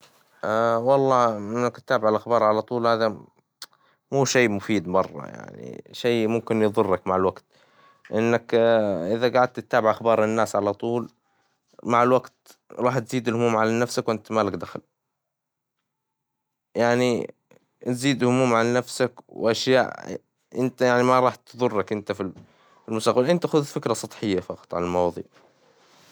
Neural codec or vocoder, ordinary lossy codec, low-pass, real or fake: none; none; none; real